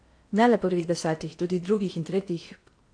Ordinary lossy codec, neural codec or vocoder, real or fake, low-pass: AAC, 48 kbps; codec, 16 kHz in and 24 kHz out, 0.8 kbps, FocalCodec, streaming, 65536 codes; fake; 9.9 kHz